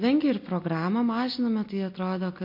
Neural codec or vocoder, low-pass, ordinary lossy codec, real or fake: none; 5.4 kHz; MP3, 32 kbps; real